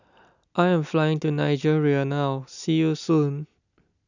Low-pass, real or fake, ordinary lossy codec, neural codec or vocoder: 7.2 kHz; real; none; none